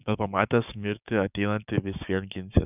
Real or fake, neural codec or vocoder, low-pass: fake; codec, 16 kHz, 6 kbps, DAC; 3.6 kHz